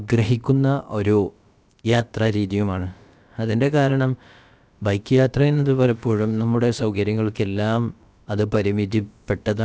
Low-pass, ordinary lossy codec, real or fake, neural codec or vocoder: none; none; fake; codec, 16 kHz, about 1 kbps, DyCAST, with the encoder's durations